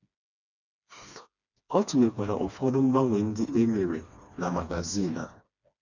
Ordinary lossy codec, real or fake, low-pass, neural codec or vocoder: none; fake; 7.2 kHz; codec, 16 kHz, 2 kbps, FreqCodec, smaller model